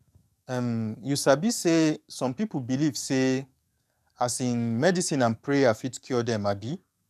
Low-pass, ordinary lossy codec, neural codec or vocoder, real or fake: 14.4 kHz; none; codec, 44.1 kHz, 7.8 kbps, DAC; fake